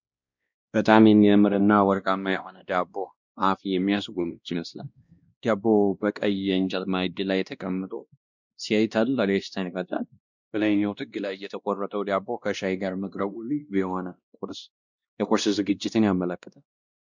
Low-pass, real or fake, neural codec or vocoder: 7.2 kHz; fake; codec, 16 kHz, 1 kbps, X-Codec, WavLM features, trained on Multilingual LibriSpeech